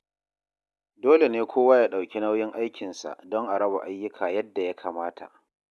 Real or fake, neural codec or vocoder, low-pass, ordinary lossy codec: real; none; none; none